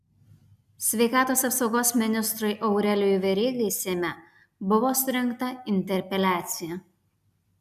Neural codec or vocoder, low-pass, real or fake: none; 14.4 kHz; real